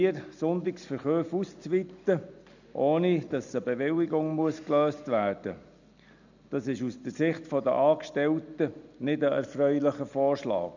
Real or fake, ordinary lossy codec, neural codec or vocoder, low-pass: real; none; none; 7.2 kHz